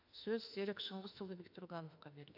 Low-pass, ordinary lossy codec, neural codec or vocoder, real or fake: 5.4 kHz; AAC, 48 kbps; autoencoder, 48 kHz, 32 numbers a frame, DAC-VAE, trained on Japanese speech; fake